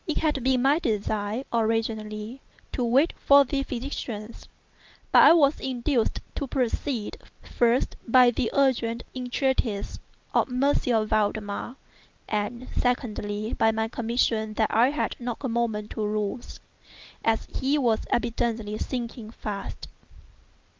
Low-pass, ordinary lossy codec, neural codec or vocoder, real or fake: 7.2 kHz; Opus, 24 kbps; none; real